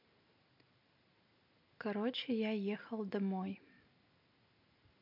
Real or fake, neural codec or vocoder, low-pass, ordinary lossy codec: real; none; 5.4 kHz; MP3, 48 kbps